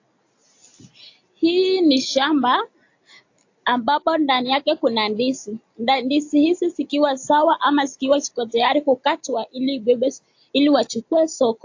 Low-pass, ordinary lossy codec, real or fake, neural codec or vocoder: 7.2 kHz; AAC, 48 kbps; real; none